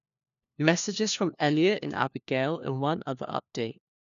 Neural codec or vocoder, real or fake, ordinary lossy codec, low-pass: codec, 16 kHz, 1 kbps, FunCodec, trained on LibriTTS, 50 frames a second; fake; none; 7.2 kHz